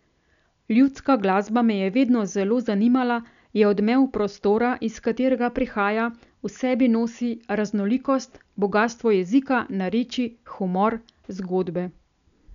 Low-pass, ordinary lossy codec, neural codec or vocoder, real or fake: 7.2 kHz; none; none; real